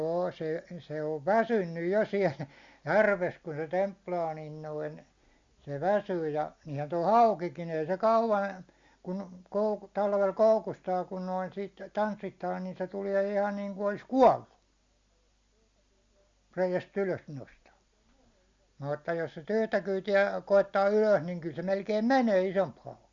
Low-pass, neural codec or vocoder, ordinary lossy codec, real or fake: 7.2 kHz; none; Opus, 64 kbps; real